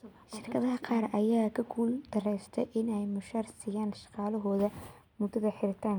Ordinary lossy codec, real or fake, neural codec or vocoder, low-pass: none; real; none; none